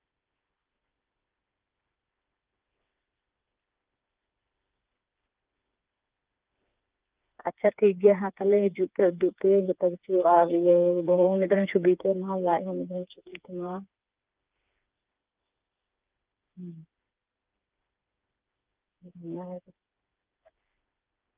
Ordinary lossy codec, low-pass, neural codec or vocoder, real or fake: Opus, 24 kbps; 3.6 kHz; codec, 16 kHz, 4 kbps, FreqCodec, smaller model; fake